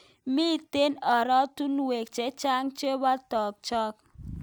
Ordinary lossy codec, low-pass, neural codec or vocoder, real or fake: none; none; none; real